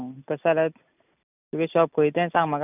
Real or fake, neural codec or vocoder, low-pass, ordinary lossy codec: real; none; 3.6 kHz; none